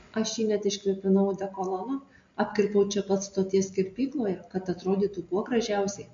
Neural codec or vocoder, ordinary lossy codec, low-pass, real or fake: none; MP3, 48 kbps; 7.2 kHz; real